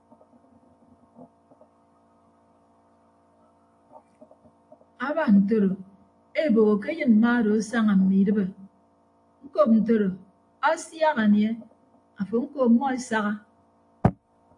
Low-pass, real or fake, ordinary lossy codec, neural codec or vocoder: 10.8 kHz; real; AAC, 48 kbps; none